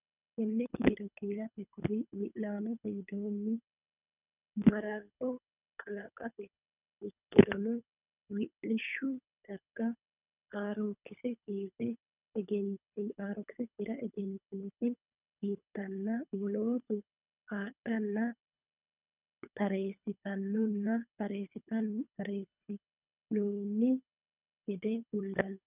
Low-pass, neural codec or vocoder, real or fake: 3.6 kHz; codec, 24 kHz, 3 kbps, HILCodec; fake